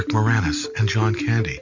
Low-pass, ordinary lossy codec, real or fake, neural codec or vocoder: 7.2 kHz; MP3, 48 kbps; real; none